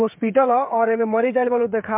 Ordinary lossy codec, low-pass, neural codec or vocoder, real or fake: MP3, 32 kbps; 3.6 kHz; codec, 16 kHz, 8 kbps, FreqCodec, smaller model; fake